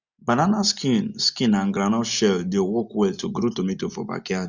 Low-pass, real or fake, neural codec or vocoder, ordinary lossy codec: 7.2 kHz; real; none; none